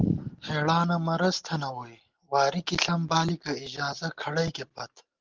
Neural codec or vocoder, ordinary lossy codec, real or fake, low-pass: none; Opus, 16 kbps; real; 7.2 kHz